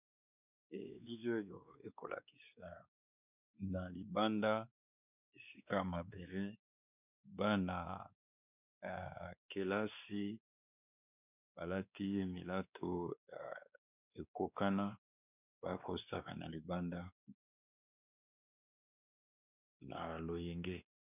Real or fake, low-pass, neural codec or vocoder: fake; 3.6 kHz; codec, 16 kHz, 2 kbps, X-Codec, WavLM features, trained on Multilingual LibriSpeech